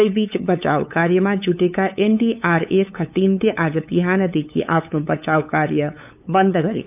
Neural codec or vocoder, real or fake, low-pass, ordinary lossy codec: codec, 16 kHz, 8 kbps, FunCodec, trained on LibriTTS, 25 frames a second; fake; 3.6 kHz; none